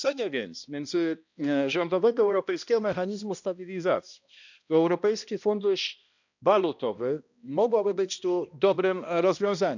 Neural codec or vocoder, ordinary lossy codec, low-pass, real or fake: codec, 16 kHz, 1 kbps, X-Codec, HuBERT features, trained on balanced general audio; none; 7.2 kHz; fake